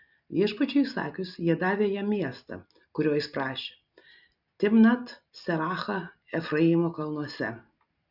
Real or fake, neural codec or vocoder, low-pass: real; none; 5.4 kHz